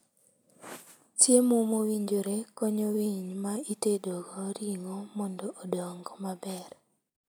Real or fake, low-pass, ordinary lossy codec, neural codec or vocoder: real; none; none; none